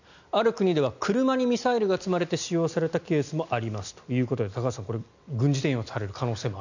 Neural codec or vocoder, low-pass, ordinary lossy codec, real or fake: none; 7.2 kHz; none; real